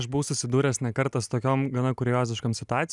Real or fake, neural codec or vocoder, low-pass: real; none; 10.8 kHz